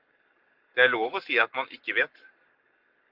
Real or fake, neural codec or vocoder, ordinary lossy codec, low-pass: fake; codec, 44.1 kHz, 7.8 kbps, Pupu-Codec; Opus, 32 kbps; 5.4 kHz